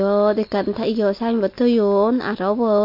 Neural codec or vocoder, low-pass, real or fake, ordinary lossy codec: none; 5.4 kHz; real; MP3, 32 kbps